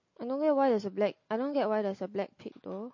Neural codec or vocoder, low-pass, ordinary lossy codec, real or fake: none; 7.2 kHz; MP3, 32 kbps; real